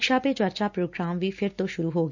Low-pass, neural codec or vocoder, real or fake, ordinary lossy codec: 7.2 kHz; none; real; none